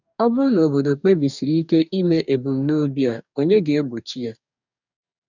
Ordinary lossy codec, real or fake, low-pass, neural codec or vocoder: none; fake; 7.2 kHz; codec, 44.1 kHz, 2.6 kbps, DAC